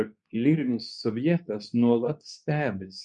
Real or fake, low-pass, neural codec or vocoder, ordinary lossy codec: fake; 10.8 kHz; codec, 24 kHz, 0.9 kbps, WavTokenizer, medium speech release version 2; MP3, 96 kbps